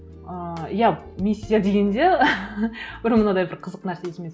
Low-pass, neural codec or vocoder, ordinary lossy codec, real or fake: none; none; none; real